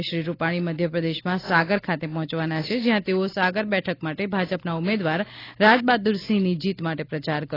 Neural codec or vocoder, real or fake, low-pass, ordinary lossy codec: none; real; 5.4 kHz; AAC, 24 kbps